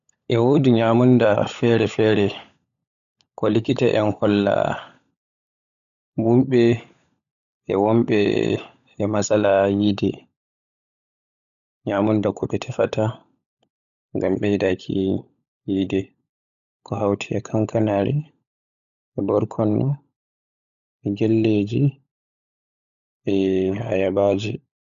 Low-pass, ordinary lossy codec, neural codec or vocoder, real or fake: 7.2 kHz; none; codec, 16 kHz, 16 kbps, FunCodec, trained on LibriTTS, 50 frames a second; fake